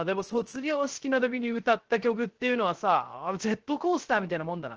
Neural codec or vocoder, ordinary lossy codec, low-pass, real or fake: codec, 16 kHz, 0.3 kbps, FocalCodec; Opus, 16 kbps; 7.2 kHz; fake